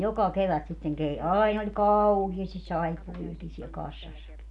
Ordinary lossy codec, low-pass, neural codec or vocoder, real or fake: none; 10.8 kHz; none; real